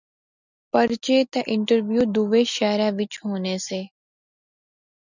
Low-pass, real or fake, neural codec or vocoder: 7.2 kHz; real; none